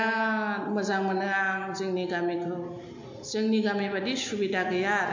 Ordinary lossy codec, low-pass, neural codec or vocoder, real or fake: MP3, 48 kbps; 7.2 kHz; none; real